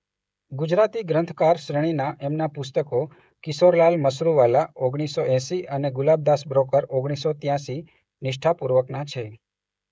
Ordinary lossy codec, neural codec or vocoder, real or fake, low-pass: none; codec, 16 kHz, 16 kbps, FreqCodec, smaller model; fake; none